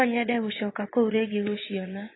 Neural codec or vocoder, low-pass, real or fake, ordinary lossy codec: vocoder, 24 kHz, 100 mel bands, Vocos; 7.2 kHz; fake; AAC, 16 kbps